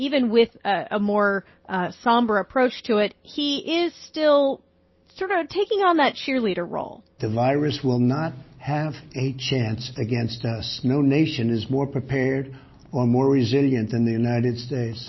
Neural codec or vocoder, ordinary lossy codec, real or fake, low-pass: none; MP3, 24 kbps; real; 7.2 kHz